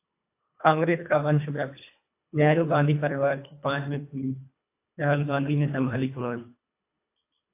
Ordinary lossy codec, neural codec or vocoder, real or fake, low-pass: AAC, 24 kbps; codec, 24 kHz, 1.5 kbps, HILCodec; fake; 3.6 kHz